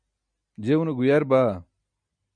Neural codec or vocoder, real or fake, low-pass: none; real; 9.9 kHz